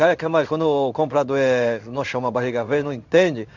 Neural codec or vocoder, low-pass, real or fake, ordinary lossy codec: codec, 16 kHz in and 24 kHz out, 1 kbps, XY-Tokenizer; 7.2 kHz; fake; none